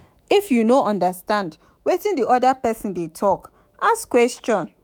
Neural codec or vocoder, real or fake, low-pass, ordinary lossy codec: autoencoder, 48 kHz, 128 numbers a frame, DAC-VAE, trained on Japanese speech; fake; none; none